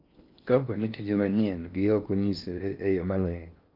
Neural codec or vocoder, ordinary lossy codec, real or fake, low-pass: codec, 16 kHz in and 24 kHz out, 0.6 kbps, FocalCodec, streaming, 4096 codes; Opus, 24 kbps; fake; 5.4 kHz